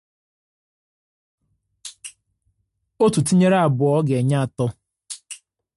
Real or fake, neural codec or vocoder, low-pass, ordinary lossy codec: real; none; 14.4 kHz; MP3, 48 kbps